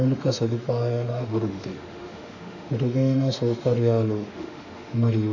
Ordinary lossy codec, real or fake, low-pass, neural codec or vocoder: none; fake; 7.2 kHz; autoencoder, 48 kHz, 32 numbers a frame, DAC-VAE, trained on Japanese speech